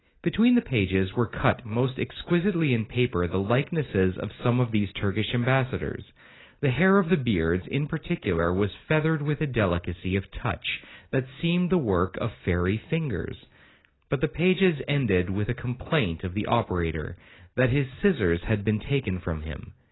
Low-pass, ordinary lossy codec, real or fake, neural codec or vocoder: 7.2 kHz; AAC, 16 kbps; real; none